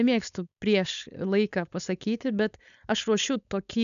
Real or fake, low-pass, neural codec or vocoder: fake; 7.2 kHz; codec, 16 kHz, 4.8 kbps, FACodec